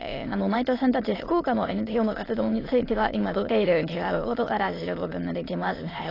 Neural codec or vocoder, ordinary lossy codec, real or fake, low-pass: autoencoder, 22.05 kHz, a latent of 192 numbers a frame, VITS, trained on many speakers; AAC, 24 kbps; fake; 5.4 kHz